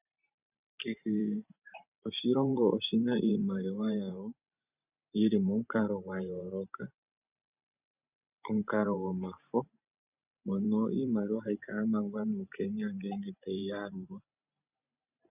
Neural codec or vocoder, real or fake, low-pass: vocoder, 44.1 kHz, 128 mel bands every 512 samples, BigVGAN v2; fake; 3.6 kHz